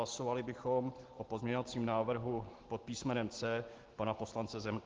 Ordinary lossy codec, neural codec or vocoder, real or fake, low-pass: Opus, 16 kbps; none; real; 7.2 kHz